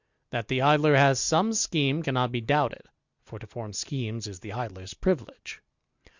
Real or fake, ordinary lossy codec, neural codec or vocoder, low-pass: real; Opus, 64 kbps; none; 7.2 kHz